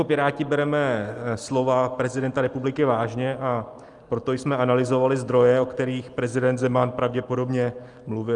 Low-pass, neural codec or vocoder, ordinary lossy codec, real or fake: 10.8 kHz; none; Opus, 32 kbps; real